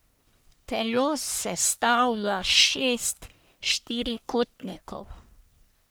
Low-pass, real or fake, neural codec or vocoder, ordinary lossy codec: none; fake; codec, 44.1 kHz, 1.7 kbps, Pupu-Codec; none